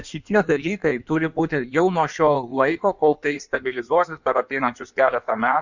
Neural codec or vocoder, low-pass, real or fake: codec, 16 kHz in and 24 kHz out, 1.1 kbps, FireRedTTS-2 codec; 7.2 kHz; fake